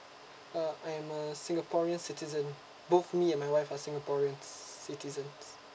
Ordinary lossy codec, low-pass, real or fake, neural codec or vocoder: none; none; real; none